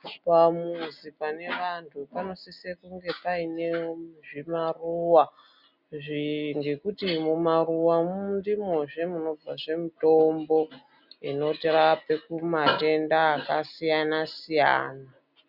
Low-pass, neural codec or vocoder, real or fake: 5.4 kHz; none; real